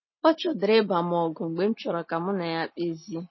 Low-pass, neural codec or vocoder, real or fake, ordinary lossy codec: 7.2 kHz; none; real; MP3, 24 kbps